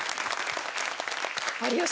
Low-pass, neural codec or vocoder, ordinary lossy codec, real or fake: none; none; none; real